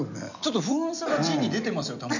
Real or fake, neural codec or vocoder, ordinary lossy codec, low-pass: real; none; none; 7.2 kHz